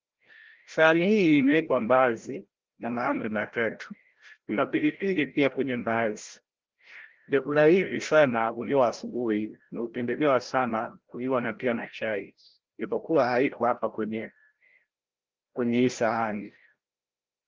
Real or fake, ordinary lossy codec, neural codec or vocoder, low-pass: fake; Opus, 16 kbps; codec, 16 kHz, 0.5 kbps, FreqCodec, larger model; 7.2 kHz